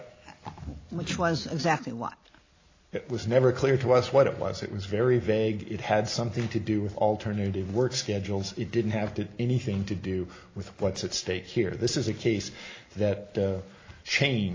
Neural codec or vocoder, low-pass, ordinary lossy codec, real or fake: none; 7.2 kHz; AAC, 32 kbps; real